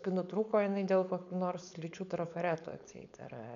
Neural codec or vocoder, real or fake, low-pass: codec, 16 kHz, 4.8 kbps, FACodec; fake; 7.2 kHz